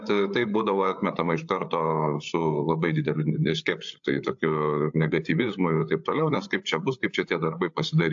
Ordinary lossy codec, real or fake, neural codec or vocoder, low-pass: AAC, 64 kbps; fake; codec, 16 kHz, 8 kbps, FreqCodec, larger model; 7.2 kHz